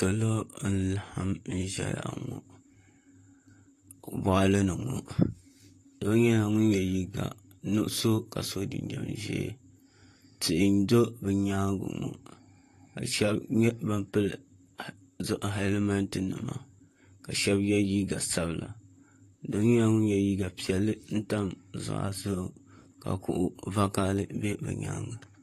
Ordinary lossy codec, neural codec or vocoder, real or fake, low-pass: AAC, 48 kbps; vocoder, 44.1 kHz, 128 mel bands, Pupu-Vocoder; fake; 14.4 kHz